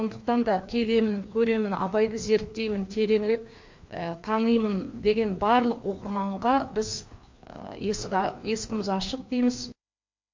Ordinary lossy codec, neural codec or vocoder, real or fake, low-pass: MP3, 48 kbps; codec, 16 kHz, 2 kbps, FreqCodec, larger model; fake; 7.2 kHz